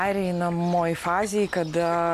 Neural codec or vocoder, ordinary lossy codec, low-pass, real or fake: none; MP3, 64 kbps; 14.4 kHz; real